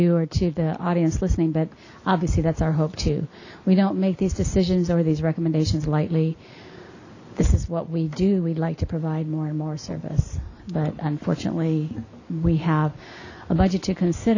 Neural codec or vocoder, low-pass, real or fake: none; 7.2 kHz; real